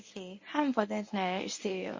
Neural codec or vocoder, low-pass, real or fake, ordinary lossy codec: codec, 24 kHz, 0.9 kbps, WavTokenizer, medium speech release version 1; 7.2 kHz; fake; MP3, 32 kbps